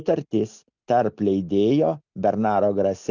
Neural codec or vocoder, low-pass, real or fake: none; 7.2 kHz; real